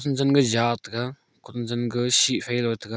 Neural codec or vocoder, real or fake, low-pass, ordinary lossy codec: none; real; none; none